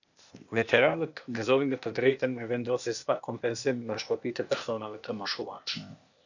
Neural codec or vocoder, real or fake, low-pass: codec, 16 kHz, 0.8 kbps, ZipCodec; fake; 7.2 kHz